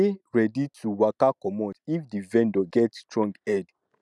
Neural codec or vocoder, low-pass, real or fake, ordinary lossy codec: none; none; real; none